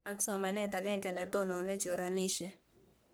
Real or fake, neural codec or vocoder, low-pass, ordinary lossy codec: fake; codec, 44.1 kHz, 1.7 kbps, Pupu-Codec; none; none